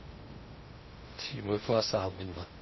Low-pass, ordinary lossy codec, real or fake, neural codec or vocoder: 7.2 kHz; MP3, 24 kbps; fake; codec, 16 kHz in and 24 kHz out, 0.6 kbps, FocalCodec, streaming, 2048 codes